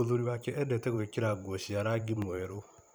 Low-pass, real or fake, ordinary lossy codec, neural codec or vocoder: none; fake; none; vocoder, 44.1 kHz, 128 mel bands every 512 samples, BigVGAN v2